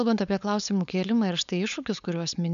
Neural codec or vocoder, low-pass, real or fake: codec, 16 kHz, 4.8 kbps, FACodec; 7.2 kHz; fake